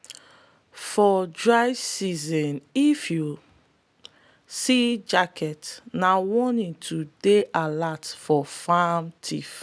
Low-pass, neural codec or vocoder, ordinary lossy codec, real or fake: none; none; none; real